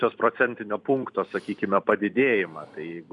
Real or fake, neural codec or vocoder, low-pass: fake; vocoder, 44.1 kHz, 128 mel bands every 256 samples, BigVGAN v2; 10.8 kHz